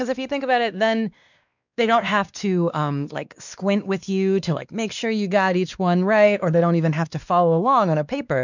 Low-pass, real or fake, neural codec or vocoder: 7.2 kHz; fake; codec, 16 kHz, 2 kbps, X-Codec, WavLM features, trained on Multilingual LibriSpeech